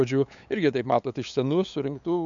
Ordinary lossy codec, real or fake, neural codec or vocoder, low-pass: MP3, 64 kbps; fake; codec, 16 kHz, 8 kbps, FunCodec, trained on LibriTTS, 25 frames a second; 7.2 kHz